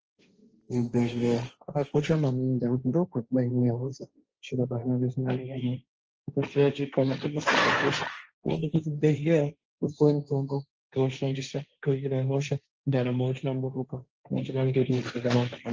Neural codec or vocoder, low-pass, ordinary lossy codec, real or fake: codec, 16 kHz, 1.1 kbps, Voila-Tokenizer; 7.2 kHz; Opus, 24 kbps; fake